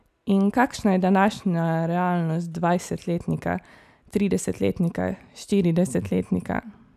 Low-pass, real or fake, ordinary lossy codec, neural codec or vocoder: 14.4 kHz; real; none; none